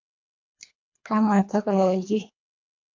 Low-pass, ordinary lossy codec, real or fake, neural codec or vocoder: 7.2 kHz; MP3, 48 kbps; fake; codec, 24 kHz, 1.5 kbps, HILCodec